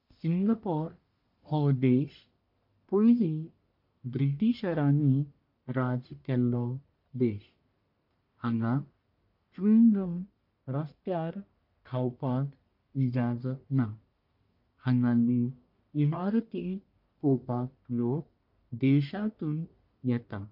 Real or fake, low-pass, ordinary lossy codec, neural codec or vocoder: fake; 5.4 kHz; MP3, 48 kbps; codec, 44.1 kHz, 1.7 kbps, Pupu-Codec